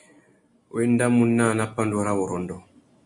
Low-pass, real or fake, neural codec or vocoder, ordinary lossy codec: 10.8 kHz; real; none; Opus, 64 kbps